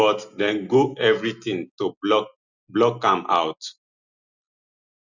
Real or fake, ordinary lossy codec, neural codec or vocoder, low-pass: real; none; none; 7.2 kHz